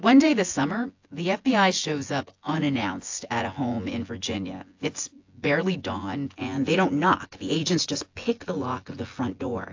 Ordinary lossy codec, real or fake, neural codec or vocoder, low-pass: AAC, 48 kbps; fake; vocoder, 24 kHz, 100 mel bands, Vocos; 7.2 kHz